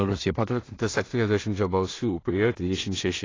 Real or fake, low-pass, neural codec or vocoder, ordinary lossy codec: fake; 7.2 kHz; codec, 16 kHz in and 24 kHz out, 0.4 kbps, LongCat-Audio-Codec, two codebook decoder; AAC, 32 kbps